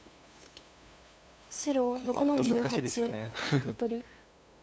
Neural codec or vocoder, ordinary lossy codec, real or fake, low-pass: codec, 16 kHz, 2 kbps, FunCodec, trained on LibriTTS, 25 frames a second; none; fake; none